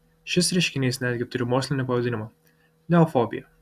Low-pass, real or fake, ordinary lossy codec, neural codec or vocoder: 14.4 kHz; real; AAC, 96 kbps; none